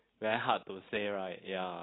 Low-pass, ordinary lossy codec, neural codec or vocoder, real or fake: 7.2 kHz; AAC, 16 kbps; none; real